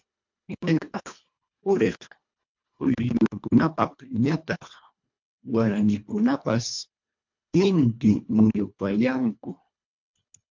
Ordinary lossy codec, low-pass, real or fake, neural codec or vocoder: MP3, 64 kbps; 7.2 kHz; fake; codec, 24 kHz, 1.5 kbps, HILCodec